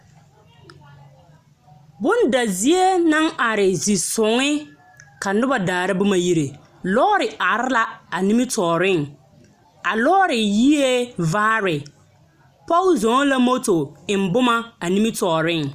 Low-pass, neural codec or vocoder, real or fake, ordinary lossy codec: 14.4 kHz; none; real; Opus, 64 kbps